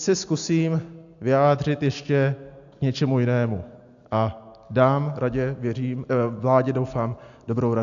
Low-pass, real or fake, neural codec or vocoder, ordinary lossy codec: 7.2 kHz; real; none; AAC, 64 kbps